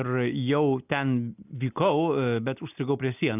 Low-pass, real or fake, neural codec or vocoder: 3.6 kHz; real; none